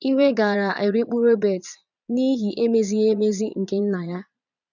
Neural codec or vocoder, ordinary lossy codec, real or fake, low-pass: vocoder, 22.05 kHz, 80 mel bands, Vocos; none; fake; 7.2 kHz